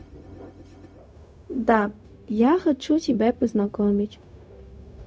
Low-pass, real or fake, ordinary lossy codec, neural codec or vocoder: none; fake; none; codec, 16 kHz, 0.4 kbps, LongCat-Audio-Codec